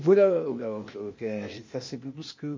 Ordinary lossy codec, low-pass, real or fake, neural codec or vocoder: MP3, 32 kbps; 7.2 kHz; fake; codec, 16 kHz, 0.8 kbps, ZipCodec